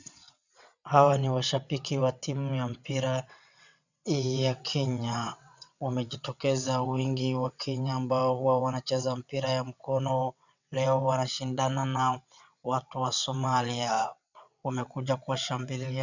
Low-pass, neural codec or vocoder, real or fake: 7.2 kHz; vocoder, 22.05 kHz, 80 mel bands, WaveNeXt; fake